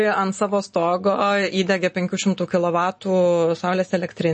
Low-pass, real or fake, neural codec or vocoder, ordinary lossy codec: 10.8 kHz; real; none; MP3, 32 kbps